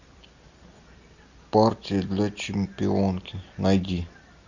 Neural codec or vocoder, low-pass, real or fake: none; 7.2 kHz; real